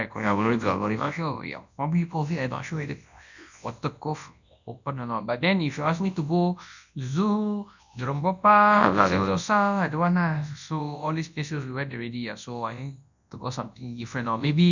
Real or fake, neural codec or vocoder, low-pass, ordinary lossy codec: fake; codec, 24 kHz, 0.9 kbps, WavTokenizer, large speech release; 7.2 kHz; none